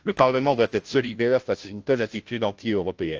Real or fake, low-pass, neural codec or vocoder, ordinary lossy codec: fake; 7.2 kHz; codec, 16 kHz, 0.5 kbps, FunCodec, trained on Chinese and English, 25 frames a second; Opus, 24 kbps